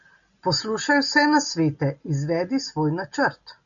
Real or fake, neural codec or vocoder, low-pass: real; none; 7.2 kHz